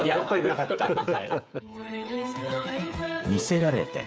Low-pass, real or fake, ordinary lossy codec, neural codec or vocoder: none; fake; none; codec, 16 kHz, 8 kbps, FreqCodec, smaller model